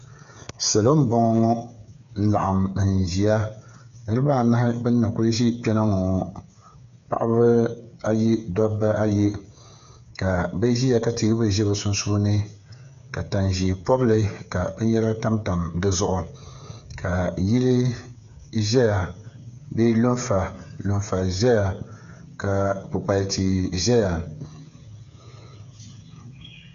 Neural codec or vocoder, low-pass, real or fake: codec, 16 kHz, 8 kbps, FreqCodec, smaller model; 7.2 kHz; fake